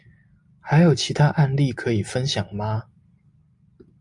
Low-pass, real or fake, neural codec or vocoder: 10.8 kHz; real; none